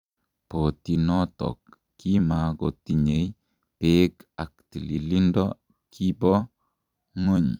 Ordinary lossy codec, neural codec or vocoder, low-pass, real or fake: none; vocoder, 44.1 kHz, 128 mel bands every 256 samples, BigVGAN v2; 19.8 kHz; fake